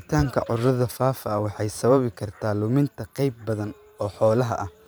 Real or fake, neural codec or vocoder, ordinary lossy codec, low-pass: fake; vocoder, 44.1 kHz, 128 mel bands every 512 samples, BigVGAN v2; none; none